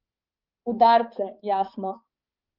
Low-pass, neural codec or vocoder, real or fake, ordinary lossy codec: 5.4 kHz; codec, 16 kHz, 4 kbps, X-Codec, HuBERT features, trained on balanced general audio; fake; Opus, 32 kbps